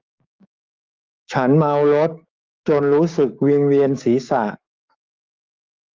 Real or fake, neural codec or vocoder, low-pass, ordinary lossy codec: fake; codec, 16 kHz, 6 kbps, DAC; 7.2 kHz; Opus, 32 kbps